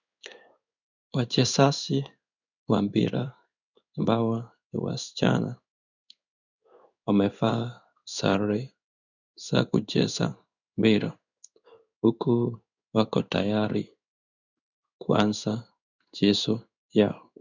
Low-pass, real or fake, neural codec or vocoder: 7.2 kHz; fake; codec, 16 kHz in and 24 kHz out, 1 kbps, XY-Tokenizer